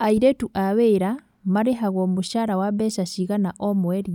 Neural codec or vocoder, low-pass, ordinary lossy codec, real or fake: none; 19.8 kHz; none; real